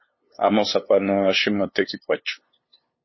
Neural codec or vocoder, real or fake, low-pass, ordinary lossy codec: codec, 16 kHz, 2 kbps, FunCodec, trained on LibriTTS, 25 frames a second; fake; 7.2 kHz; MP3, 24 kbps